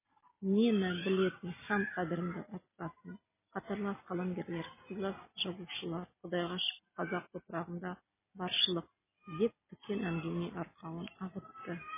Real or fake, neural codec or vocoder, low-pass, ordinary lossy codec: real; none; 3.6 kHz; MP3, 16 kbps